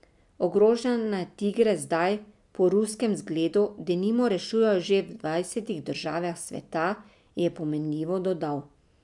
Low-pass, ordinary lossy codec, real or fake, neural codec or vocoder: 10.8 kHz; none; real; none